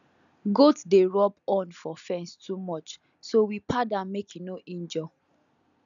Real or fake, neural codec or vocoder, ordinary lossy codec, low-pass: real; none; none; 7.2 kHz